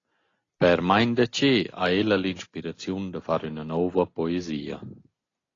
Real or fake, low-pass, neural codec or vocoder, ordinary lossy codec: real; 7.2 kHz; none; AAC, 32 kbps